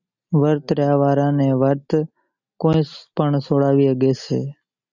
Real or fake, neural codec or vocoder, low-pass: real; none; 7.2 kHz